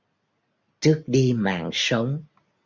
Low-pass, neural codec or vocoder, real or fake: 7.2 kHz; none; real